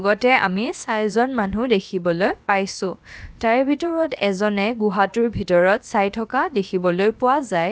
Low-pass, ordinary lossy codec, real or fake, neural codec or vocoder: none; none; fake; codec, 16 kHz, about 1 kbps, DyCAST, with the encoder's durations